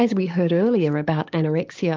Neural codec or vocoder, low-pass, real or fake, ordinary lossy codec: codec, 16 kHz, 6 kbps, DAC; 7.2 kHz; fake; Opus, 24 kbps